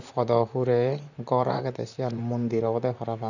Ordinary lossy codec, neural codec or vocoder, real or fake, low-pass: none; vocoder, 44.1 kHz, 128 mel bands, Pupu-Vocoder; fake; 7.2 kHz